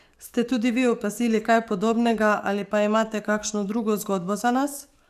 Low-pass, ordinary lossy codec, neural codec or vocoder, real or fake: 14.4 kHz; none; codec, 44.1 kHz, 7.8 kbps, DAC; fake